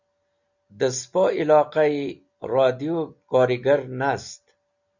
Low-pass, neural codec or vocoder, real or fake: 7.2 kHz; none; real